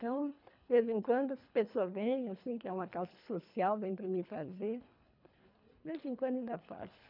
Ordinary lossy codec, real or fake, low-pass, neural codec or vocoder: none; fake; 5.4 kHz; codec, 24 kHz, 3 kbps, HILCodec